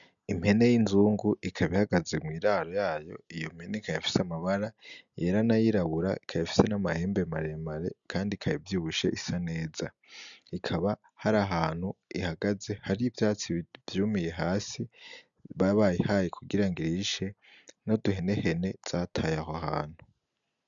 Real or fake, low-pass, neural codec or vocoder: real; 7.2 kHz; none